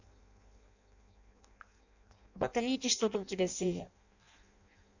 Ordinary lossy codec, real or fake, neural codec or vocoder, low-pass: none; fake; codec, 16 kHz in and 24 kHz out, 0.6 kbps, FireRedTTS-2 codec; 7.2 kHz